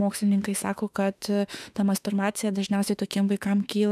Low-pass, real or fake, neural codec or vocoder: 14.4 kHz; fake; autoencoder, 48 kHz, 32 numbers a frame, DAC-VAE, trained on Japanese speech